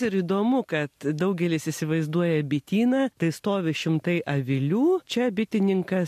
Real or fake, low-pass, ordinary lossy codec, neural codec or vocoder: real; 14.4 kHz; MP3, 64 kbps; none